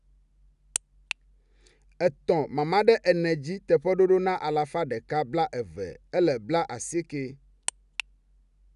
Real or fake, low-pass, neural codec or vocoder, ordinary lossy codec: real; 10.8 kHz; none; none